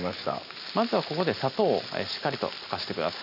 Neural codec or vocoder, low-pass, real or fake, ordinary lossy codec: none; 5.4 kHz; real; none